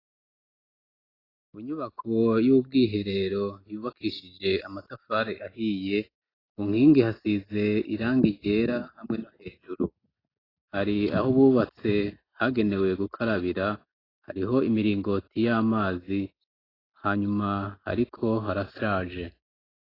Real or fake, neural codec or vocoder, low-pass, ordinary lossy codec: real; none; 5.4 kHz; AAC, 24 kbps